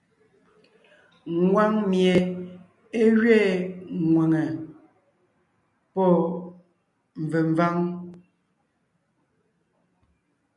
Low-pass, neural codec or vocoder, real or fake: 10.8 kHz; none; real